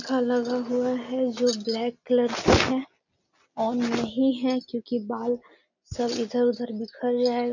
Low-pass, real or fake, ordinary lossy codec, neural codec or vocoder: 7.2 kHz; real; none; none